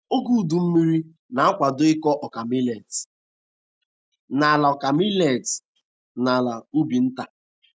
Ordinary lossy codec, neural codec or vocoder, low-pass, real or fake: none; none; none; real